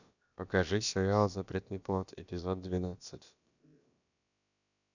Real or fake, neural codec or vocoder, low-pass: fake; codec, 16 kHz, about 1 kbps, DyCAST, with the encoder's durations; 7.2 kHz